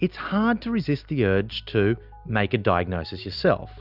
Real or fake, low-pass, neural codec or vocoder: real; 5.4 kHz; none